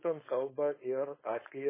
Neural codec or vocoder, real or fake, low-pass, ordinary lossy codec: codec, 16 kHz, 4.8 kbps, FACodec; fake; 3.6 kHz; MP3, 16 kbps